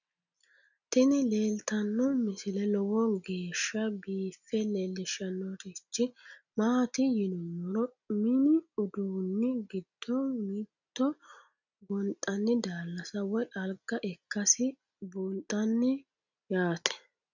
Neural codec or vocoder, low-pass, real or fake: none; 7.2 kHz; real